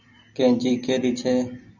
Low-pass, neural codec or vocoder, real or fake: 7.2 kHz; none; real